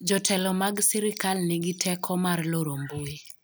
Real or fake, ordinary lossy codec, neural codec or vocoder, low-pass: real; none; none; none